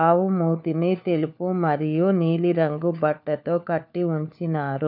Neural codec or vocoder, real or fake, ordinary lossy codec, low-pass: codec, 16 kHz, 4 kbps, FunCodec, trained on LibriTTS, 50 frames a second; fake; none; 5.4 kHz